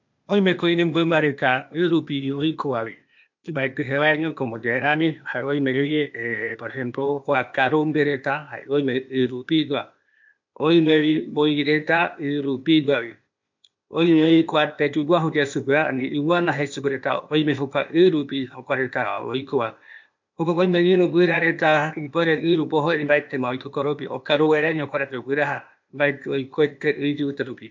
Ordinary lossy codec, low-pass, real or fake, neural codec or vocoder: MP3, 48 kbps; 7.2 kHz; fake; codec, 16 kHz, 0.8 kbps, ZipCodec